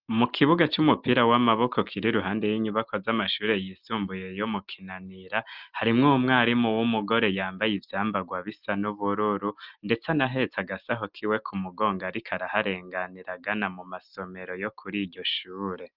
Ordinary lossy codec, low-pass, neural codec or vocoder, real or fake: Opus, 32 kbps; 5.4 kHz; none; real